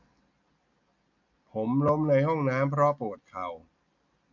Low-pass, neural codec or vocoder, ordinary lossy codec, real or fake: 7.2 kHz; none; none; real